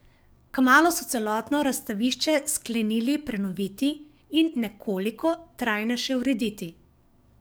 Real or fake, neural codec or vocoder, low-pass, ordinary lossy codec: fake; codec, 44.1 kHz, 7.8 kbps, DAC; none; none